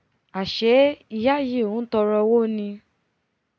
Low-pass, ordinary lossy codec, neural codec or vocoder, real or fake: none; none; none; real